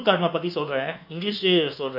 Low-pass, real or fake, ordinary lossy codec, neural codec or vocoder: 5.4 kHz; fake; none; codec, 24 kHz, 1.2 kbps, DualCodec